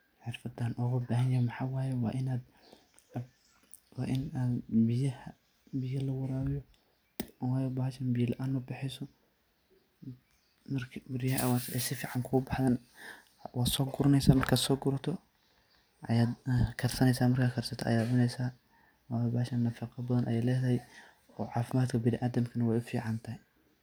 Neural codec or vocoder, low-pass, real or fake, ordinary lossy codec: none; none; real; none